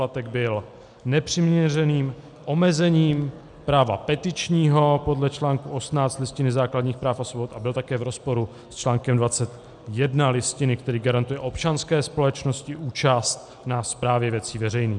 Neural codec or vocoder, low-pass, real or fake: none; 10.8 kHz; real